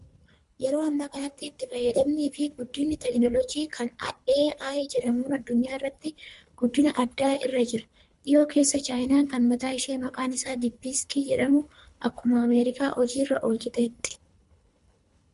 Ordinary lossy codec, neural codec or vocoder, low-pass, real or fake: MP3, 64 kbps; codec, 24 kHz, 3 kbps, HILCodec; 10.8 kHz; fake